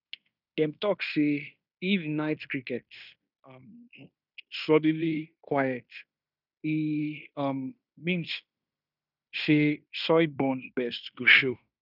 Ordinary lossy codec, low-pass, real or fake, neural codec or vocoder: none; 5.4 kHz; fake; codec, 16 kHz in and 24 kHz out, 0.9 kbps, LongCat-Audio-Codec, fine tuned four codebook decoder